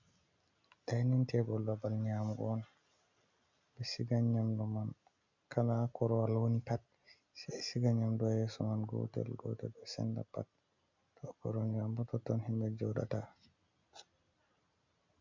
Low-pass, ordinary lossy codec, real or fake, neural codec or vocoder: 7.2 kHz; MP3, 64 kbps; real; none